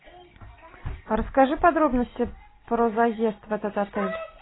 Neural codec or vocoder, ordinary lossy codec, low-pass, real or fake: none; AAC, 16 kbps; 7.2 kHz; real